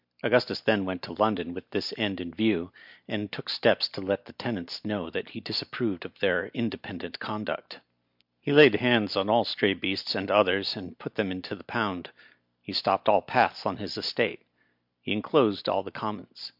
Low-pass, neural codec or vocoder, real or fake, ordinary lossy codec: 5.4 kHz; none; real; MP3, 48 kbps